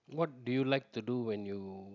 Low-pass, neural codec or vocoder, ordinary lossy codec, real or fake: 7.2 kHz; none; none; real